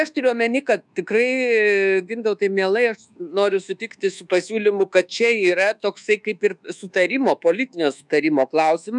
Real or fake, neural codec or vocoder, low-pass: fake; codec, 24 kHz, 1.2 kbps, DualCodec; 10.8 kHz